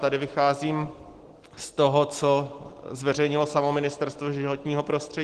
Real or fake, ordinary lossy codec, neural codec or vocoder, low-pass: real; Opus, 16 kbps; none; 10.8 kHz